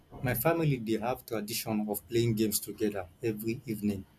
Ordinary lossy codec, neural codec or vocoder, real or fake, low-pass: none; none; real; 14.4 kHz